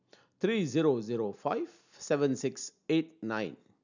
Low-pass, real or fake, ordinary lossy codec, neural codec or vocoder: 7.2 kHz; real; none; none